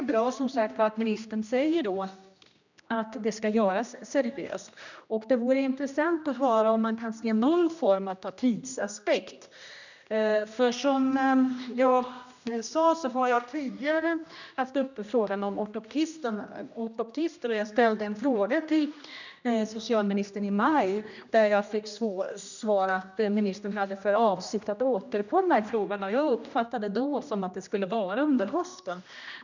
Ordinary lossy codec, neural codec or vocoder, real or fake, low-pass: none; codec, 16 kHz, 1 kbps, X-Codec, HuBERT features, trained on general audio; fake; 7.2 kHz